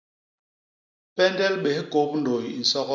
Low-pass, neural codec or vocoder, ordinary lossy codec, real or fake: 7.2 kHz; none; MP3, 64 kbps; real